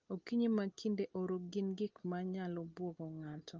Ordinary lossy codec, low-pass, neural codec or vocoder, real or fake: Opus, 32 kbps; 7.2 kHz; none; real